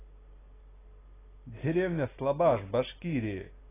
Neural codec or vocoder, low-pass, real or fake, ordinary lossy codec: none; 3.6 kHz; real; AAC, 16 kbps